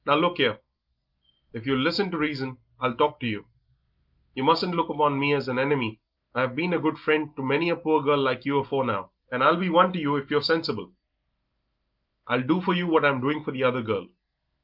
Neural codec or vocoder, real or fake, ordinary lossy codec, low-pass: none; real; Opus, 32 kbps; 5.4 kHz